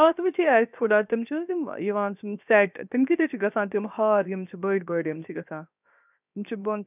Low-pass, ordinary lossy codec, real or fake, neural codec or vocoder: 3.6 kHz; none; fake; codec, 16 kHz, 0.7 kbps, FocalCodec